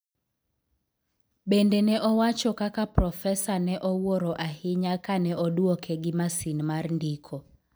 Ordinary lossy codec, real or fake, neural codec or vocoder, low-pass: none; real; none; none